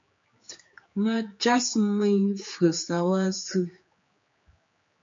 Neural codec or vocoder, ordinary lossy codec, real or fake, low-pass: codec, 16 kHz, 4 kbps, X-Codec, HuBERT features, trained on general audio; MP3, 48 kbps; fake; 7.2 kHz